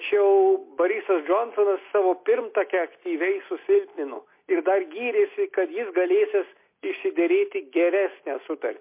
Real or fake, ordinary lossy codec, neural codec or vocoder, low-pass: real; MP3, 24 kbps; none; 3.6 kHz